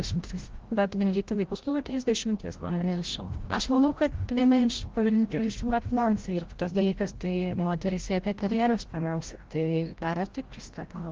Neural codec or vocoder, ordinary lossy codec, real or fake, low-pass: codec, 16 kHz, 0.5 kbps, FreqCodec, larger model; Opus, 16 kbps; fake; 7.2 kHz